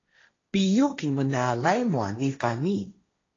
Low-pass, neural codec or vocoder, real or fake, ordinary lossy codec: 7.2 kHz; codec, 16 kHz, 1.1 kbps, Voila-Tokenizer; fake; AAC, 32 kbps